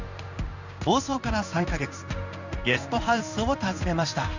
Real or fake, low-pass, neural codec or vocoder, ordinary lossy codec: fake; 7.2 kHz; codec, 16 kHz in and 24 kHz out, 1 kbps, XY-Tokenizer; none